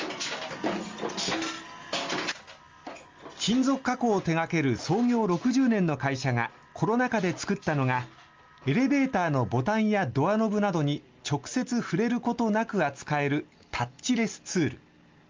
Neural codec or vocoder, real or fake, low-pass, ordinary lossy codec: none; real; 7.2 kHz; Opus, 32 kbps